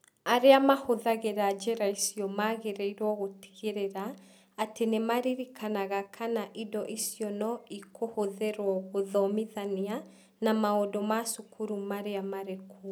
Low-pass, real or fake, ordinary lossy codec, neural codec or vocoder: none; real; none; none